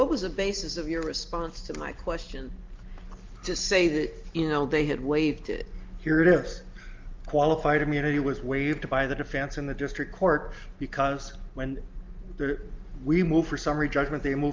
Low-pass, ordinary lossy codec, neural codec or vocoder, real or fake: 7.2 kHz; Opus, 24 kbps; none; real